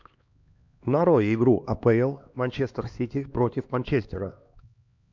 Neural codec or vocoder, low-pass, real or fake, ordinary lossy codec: codec, 16 kHz, 2 kbps, X-Codec, HuBERT features, trained on LibriSpeech; 7.2 kHz; fake; MP3, 64 kbps